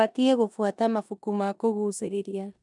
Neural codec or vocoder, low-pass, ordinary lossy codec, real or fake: codec, 16 kHz in and 24 kHz out, 0.9 kbps, LongCat-Audio-Codec, four codebook decoder; 10.8 kHz; none; fake